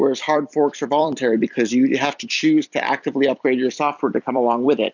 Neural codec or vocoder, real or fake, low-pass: vocoder, 44.1 kHz, 128 mel bands every 256 samples, BigVGAN v2; fake; 7.2 kHz